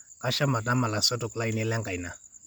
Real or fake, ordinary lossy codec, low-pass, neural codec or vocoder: real; none; none; none